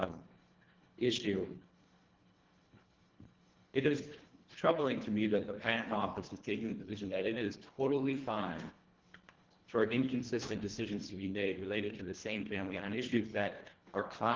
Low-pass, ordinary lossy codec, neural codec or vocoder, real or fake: 7.2 kHz; Opus, 16 kbps; codec, 24 kHz, 1.5 kbps, HILCodec; fake